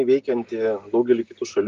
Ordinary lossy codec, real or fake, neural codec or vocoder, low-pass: Opus, 32 kbps; real; none; 7.2 kHz